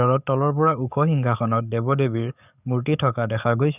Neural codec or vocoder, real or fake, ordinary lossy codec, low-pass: codec, 44.1 kHz, 7.8 kbps, DAC; fake; none; 3.6 kHz